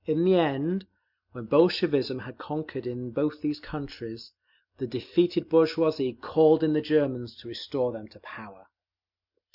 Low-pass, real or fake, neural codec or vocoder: 5.4 kHz; real; none